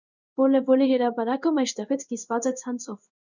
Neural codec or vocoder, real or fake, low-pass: codec, 16 kHz in and 24 kHz out, 1 kbps, XY-Tokenizer; fake; 7.2 kHz